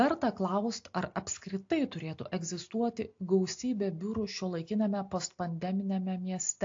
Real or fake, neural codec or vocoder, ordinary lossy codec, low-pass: real; none; AAC, 48 kbps; 7.2 kHz